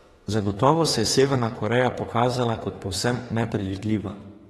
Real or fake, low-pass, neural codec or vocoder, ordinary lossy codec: fake; 19.8 kHz; autoencoder, 48 kHz, 32 numbers a frame, DAC-VAE, trained on Japanese speech; AAC, 32 kbps